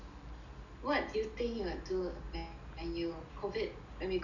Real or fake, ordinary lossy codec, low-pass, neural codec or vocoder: real; none; 7.2 kHz; none